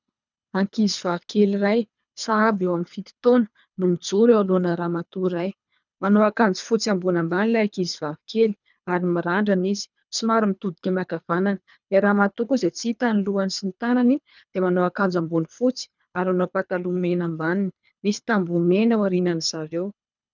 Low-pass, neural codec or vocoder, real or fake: 7.2 kHz; codec, 24 kHz, 3 kbps, HILCodec; fake